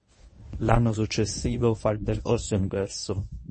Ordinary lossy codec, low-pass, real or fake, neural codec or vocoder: MP3, 32 kbps; 10.8 kHz; fake; codec, 24 kHz, 0.9 kbps, WavTokenizer, medium speech release version 1